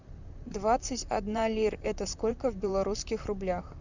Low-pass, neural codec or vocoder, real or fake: 7.2 kHz; vocoder, 44.1 kHz, 128 mel bands, Pupu-Vocoder; fake